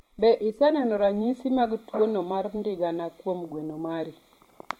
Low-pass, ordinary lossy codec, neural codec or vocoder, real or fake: 19.8 kHz; MP3, 64 kbps; vocoder, 44.1 kHz, 128 mel bands every 512 samples, BigVGAN v2; fake